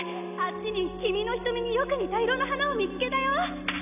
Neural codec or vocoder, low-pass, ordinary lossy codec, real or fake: none; 3.6 kHz; none; real